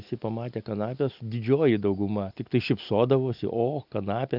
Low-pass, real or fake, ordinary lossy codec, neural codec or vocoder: 5.4 kHz; real; AAC, 48 kbps; none